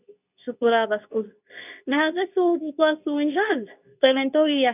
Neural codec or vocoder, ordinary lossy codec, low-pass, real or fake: codec, 24 kHz, 0.9 kbps, WavTokenizer, medium speech release version 2; none; 3.6 kHz; fake